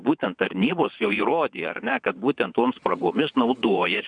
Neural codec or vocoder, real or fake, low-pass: vocoder, 22.05 kHz, 80 mel bands, WaveNeXt; fake; 9.9 kHz